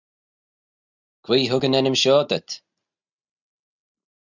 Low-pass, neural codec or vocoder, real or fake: 7.2 kHz; none; real